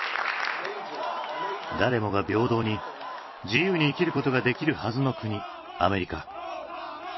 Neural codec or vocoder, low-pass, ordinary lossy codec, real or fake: vocoder, 44.1 kHz, 128 mel bands every 256 samples, BigVGAN v2; 7.2 kHz; MP3, 24 kbps; fake